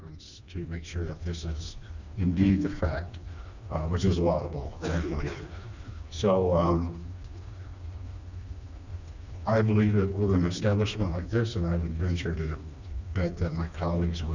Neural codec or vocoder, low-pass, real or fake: codec, 16 kHz, 2 kbps, FreqCodec, smaller model; 7.2 kHz; fake